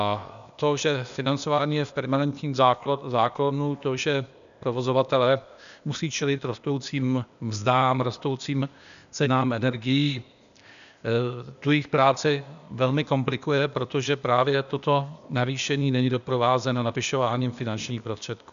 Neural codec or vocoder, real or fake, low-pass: codec, 16 kHz, 0.8 kbps, ZipCodec; fake; 7.2 kHz